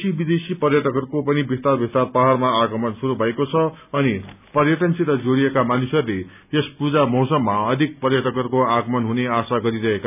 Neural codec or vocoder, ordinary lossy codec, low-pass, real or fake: none; none; 3.6 kHz; real